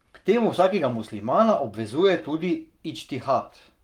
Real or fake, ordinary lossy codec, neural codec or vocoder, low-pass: fake; Opus, 32 kbps; codec, 44.1 kHz, 7.8 kbps, Pupu-Codec; 19.8 kHz